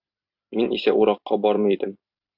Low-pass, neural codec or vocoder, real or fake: 5.4 kHz; vocoder, 44.1 kHz, 128 mel bands every 256 samples, BigVGAN v2; fake